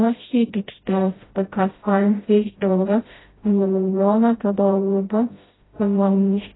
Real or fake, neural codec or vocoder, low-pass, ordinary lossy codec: fake; codec, 16 kHz, 0.5 kbps, FreqCodec, smaller model; 7.2 kHz; AAC, 16 kbps